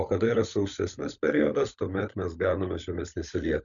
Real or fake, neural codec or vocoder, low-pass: fake; codec, 16 kHz, 16 kbps, FunCodec, trained on LibriTTS, 50 frames a second; 7.2 kHz